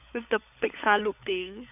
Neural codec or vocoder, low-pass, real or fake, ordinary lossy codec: codec, 16 kHz, 16 kbps, FunCodec, trained on LibriTTS, 50 frames a second; 3.6 kHz; fake; none